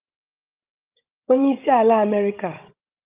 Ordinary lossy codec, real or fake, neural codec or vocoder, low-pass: Opus, 24 kbps; fake; codec, 16 kHz, 16 kbps, FreqCodec, larger model; 3.6 kHz